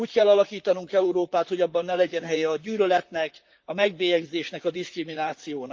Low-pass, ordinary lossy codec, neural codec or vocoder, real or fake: 7.2 kHz; Opus, 32 kbps; vocoder, 44.1 kHz, 128 mel bands, Pupu-Vocoder; fake